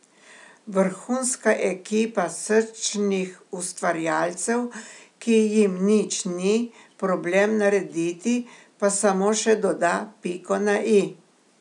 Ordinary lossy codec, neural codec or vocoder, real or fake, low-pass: none; none; real; 10.8 kHz